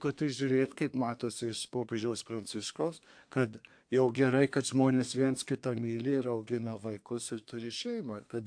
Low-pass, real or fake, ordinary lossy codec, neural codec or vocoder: 9.9 kHz; fake; AAC, 64 kbps; codec, 24 kHz, 1 kbps, SNAC